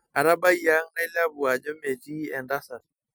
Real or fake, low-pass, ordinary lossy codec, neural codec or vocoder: real; none; none; none